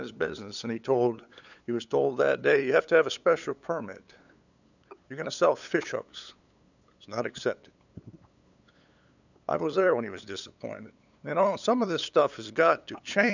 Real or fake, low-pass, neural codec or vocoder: fake; 7.2 kHz; codec, 16 kHz, 8 kbps, FunCodec, trained on LibriTTS, 25 frames a second